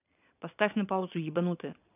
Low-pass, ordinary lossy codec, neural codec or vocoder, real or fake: 3.6 kHz; none; vocoder, 22.05 kHz, 80 mel bands, Vocos; fake